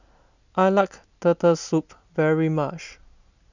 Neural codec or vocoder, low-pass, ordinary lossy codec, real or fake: none; 7.2 kHz; none; real